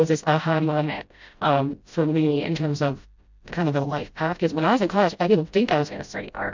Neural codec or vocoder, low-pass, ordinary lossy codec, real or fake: codec, 16 kHz, 0.5 kbps, FreqCodec, smaller model; 7.2 kHz; MP3, 64 kbps; fake